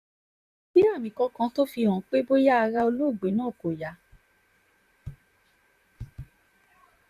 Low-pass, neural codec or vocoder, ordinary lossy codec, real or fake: 14.4 kHz; vocoder, 44.1 kHz, 128 mel bands, Pupu-Vocoder; none; fake